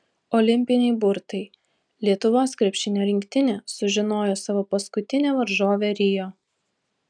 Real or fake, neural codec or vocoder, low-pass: real; none; 9.9 kHz